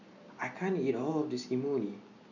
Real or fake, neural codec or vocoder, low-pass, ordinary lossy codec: real; none; 7.2 kHz; none